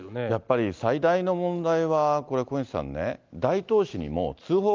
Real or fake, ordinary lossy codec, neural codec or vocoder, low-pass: real; Opus, 32 kbps; none; 7.2 kHz